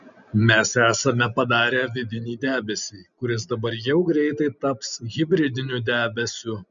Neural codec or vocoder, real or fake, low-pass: none; real; 7.2 kHz